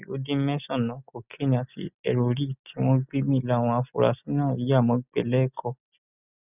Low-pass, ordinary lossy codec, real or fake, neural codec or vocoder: 3.6 kHz; none; real; none